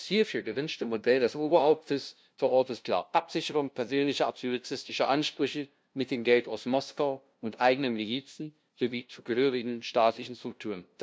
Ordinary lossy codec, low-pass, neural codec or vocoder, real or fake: none; none; codec, 16 kHz, 0.5 kbps, FunCodec, trained on LibriTTS, 25 frames a second; fake